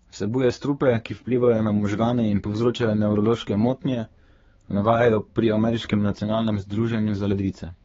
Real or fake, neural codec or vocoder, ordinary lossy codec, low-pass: fake; codec, 16 kHz, 4 kbps, X-Codec, HuBERT features, trained on balanced general audio; AAC, 24 kbps; 7.2 kHz